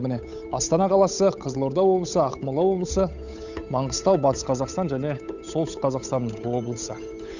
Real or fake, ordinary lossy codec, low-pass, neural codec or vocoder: fake; none; 7.2 kHz; codec, 16 kHz, 8 kbps, FunCodec, trained on Chinese and English, 25 frames a second